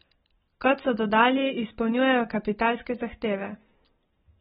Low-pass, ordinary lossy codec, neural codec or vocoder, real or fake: 19.8 kHz; AAC, 16 kbps; none; real